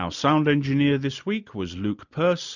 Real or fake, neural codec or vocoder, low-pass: real; none; 7.2 kHz